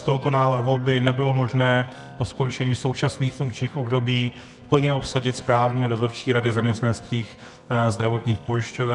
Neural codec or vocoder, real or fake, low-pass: codec, 24 kHz, 0.9 kbps, WavTokenizer, medium music audio release; fake; 10.8 kHz